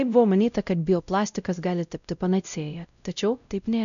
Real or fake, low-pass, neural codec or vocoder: fake; 7.2 kHz; codec, 16 kHz, 0.5 kbps, X-Codec, WavLM features, trained on Multilingual LibriSpeech